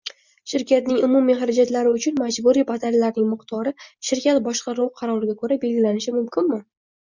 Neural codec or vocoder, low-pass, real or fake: none; 7.2 kHz; real